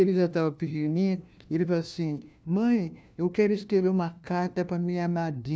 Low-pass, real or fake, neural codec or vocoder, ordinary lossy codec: none; fake; codec, 16 kHz, 1 kbps, FunCodec, trained on LibriTTS, 50 frames a second; none